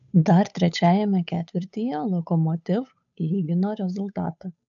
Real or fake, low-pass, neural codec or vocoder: fake; 7.2 kHz; codec, 16 kHz, 8 kbps, FunCodec, trained on Chinese and English, 25 frames a second